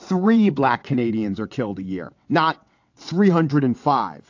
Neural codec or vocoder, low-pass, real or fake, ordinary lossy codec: vocoder, 22.05 kHz, 80 mel bands, WaveNeXt; 7.2 kHz; fake; AAC, 48 kbps